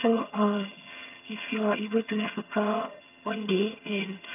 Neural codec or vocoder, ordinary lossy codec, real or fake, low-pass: vocoder, 22.05 kHz, 80 mel bands, HiFi-GAN; none; fake; 3.6 kHz